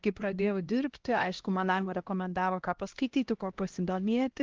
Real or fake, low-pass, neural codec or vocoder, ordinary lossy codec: fake; 7.2 kHz; codec, 16 kHz, 1 kbps, X-Codec, HuBERT features, trained on LibriSpeech; Opus, 16 kbps